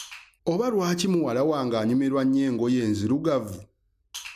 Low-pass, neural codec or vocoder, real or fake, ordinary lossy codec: 14.4 kHz; none; real; none